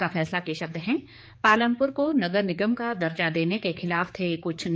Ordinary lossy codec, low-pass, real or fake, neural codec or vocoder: none; none; fake; codec, 16 kHz, 4 kbps, X-Codec, HuBERT features, trained on general audio